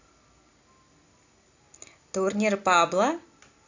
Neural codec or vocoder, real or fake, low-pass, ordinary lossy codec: none; real; 7.2 kHz; AAC, 48 kbps